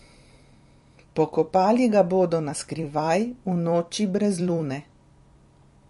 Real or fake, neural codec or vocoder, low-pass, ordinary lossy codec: fake; vocoder, 44.1 kHz, 128 mel bands every 256 samples, BigVGAN v2; 14.4 kHz; MP3, 48 kbps